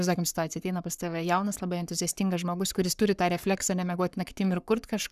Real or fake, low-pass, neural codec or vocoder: fake; 14.4 kHz; codec, 44.1 kHz, 7.8 kbps, Pupu-Codec